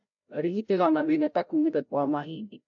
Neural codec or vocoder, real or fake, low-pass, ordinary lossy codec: codec, 16 kHz, 0.5 kbps, FreqCodec, larger model; fake; 7.2 kHz; AAC, 64 kbps